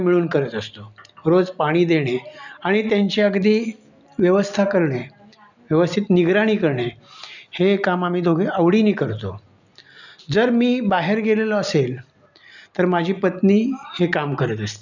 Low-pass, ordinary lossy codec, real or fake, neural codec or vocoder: 7.2 kHz; none; real; none